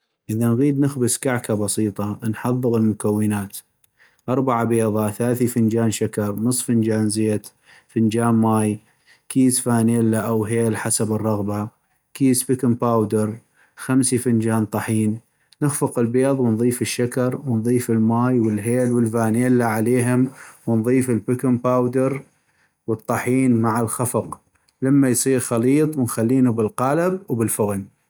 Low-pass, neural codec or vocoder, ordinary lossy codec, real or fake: none; none; none; real